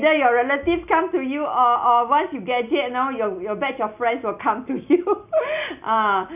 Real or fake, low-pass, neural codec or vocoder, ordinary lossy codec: real; 3.6 kHz; none; none